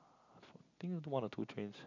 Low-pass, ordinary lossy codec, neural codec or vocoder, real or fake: 7.2 kHz; none; none; real